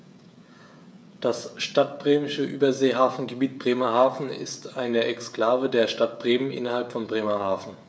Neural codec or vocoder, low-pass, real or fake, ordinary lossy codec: codec, 16 kHz, 16 kbps, FreqCodec, smaller model; none; fake; none